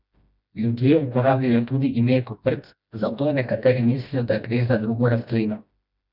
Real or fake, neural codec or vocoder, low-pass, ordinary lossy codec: fake; codec, 16 kHz, 1 kbps, FreqCodec, smaller model; 5.4 kHz; none